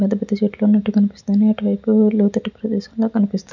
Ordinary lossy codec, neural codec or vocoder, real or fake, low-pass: none; none; real; 7.2 kHz